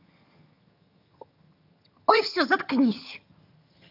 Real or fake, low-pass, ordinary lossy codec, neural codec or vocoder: fake; 5.4 kHz; none; vocoder, 22.05 kHz, 80 mel bands, HiFi-GAN